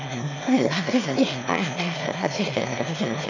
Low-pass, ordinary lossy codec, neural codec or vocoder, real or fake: 7.2 kHz; none; autoencoder, 22.05 kHz, a latent of 192 numbers a frame, VITS, trained on one speaker; fake